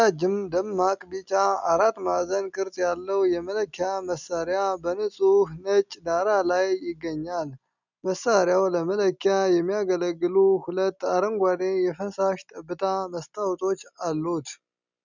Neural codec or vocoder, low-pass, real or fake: none; 7.2 kHz; real